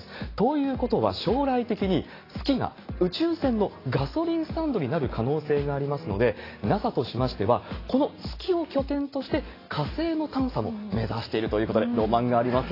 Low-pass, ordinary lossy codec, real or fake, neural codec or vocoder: 5.4 kHz; AAC, 24 kbps; real; none